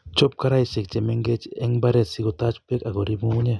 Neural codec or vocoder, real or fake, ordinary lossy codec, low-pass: none; real; none; none